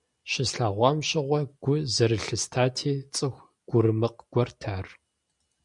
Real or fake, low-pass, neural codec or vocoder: real; 10.8 kHz; none